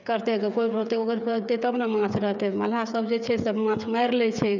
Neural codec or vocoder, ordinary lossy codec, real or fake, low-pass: codec, 16 kHz, 8 kbps, FreqCodec, smaller model; none; fake; 7.2 kHz